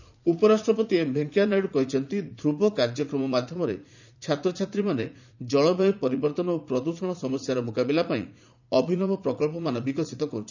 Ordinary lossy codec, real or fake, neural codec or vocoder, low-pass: none; fake; vocoder, 44.1 kHz, 80 mel bands, Vocos; 7.2 kHz